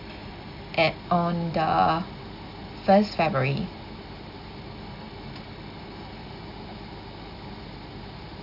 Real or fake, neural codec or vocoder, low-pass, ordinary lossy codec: real; none; 5.4 kHz; none